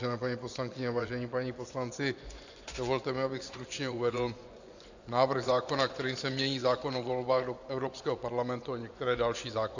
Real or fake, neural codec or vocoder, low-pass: fake; vocoder, 24 kHz, 100 mel bands, Vocos; 7.2 kHz